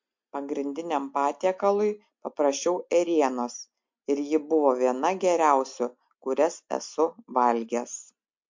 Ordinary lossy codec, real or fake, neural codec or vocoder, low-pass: MP3, 48 kbps; real; none; 7.2 kHz